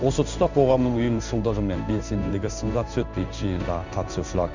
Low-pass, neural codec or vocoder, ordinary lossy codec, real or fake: 7.2 kHz; codec, 16 kHz in and 24 kHz out, 1 kbps, XY-Tokenizer; none; fake